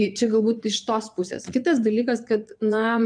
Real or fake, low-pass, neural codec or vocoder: fake; 9.9 kHz; vocoder, 22.05 kHz, 80 mel bands, Vocos